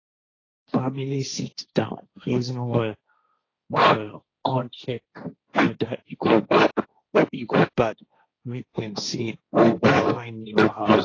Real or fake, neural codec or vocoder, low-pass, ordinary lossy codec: fake; codec, 16 kHz, 1.1 kbps, Voila-Tokenizer; 7.2 kHz; AAC, 32 kbps